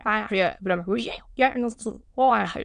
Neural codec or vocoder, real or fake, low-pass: autoencoder, 22.05 kHz, a latent of 192 numbers a frame, VITS, trained on many speakers; fake; 9.9 kHz